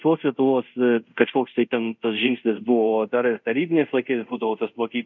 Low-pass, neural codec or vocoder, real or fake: 7.2 kHz; codec, 24 kHz, 0.5 kbps, DualCodec; fake